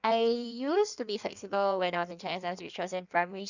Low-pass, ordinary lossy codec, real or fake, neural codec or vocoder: 7.2 kHz; none; fake; codec, 16 kHz in and 24 kHz out, 1.1 kbps, FireRedTTS-2 codec